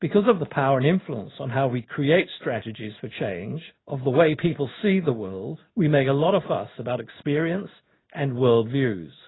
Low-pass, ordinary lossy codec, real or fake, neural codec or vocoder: 7.2 kHz; AAC, 16 kbps; fake; codec, 16 kHz in and 24 kHz out, 1 kbps, XY-Tokenizer